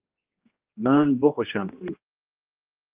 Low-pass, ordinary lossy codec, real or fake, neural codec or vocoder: 3.6 kHz; Opus, 24 kbps; fake; codec, 16 kHz, 1 kbps, X-Codec, HuBERT features, trained on general audio